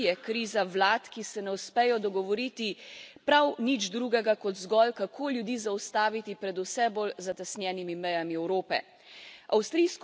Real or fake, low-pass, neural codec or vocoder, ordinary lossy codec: real; none; none; none